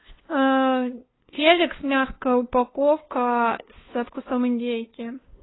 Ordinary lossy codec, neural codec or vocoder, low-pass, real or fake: AAC, 16 kbps; codec, 16 kHz, 2 kbps, FunCodec, trained on LibriTTS, 25 frames a second; 7.2 kHz; fake